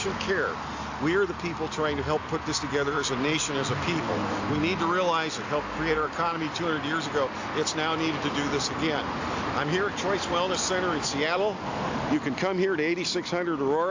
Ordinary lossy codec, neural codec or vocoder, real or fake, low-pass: AAC, 48 kbps; none; real; 7.2 kHz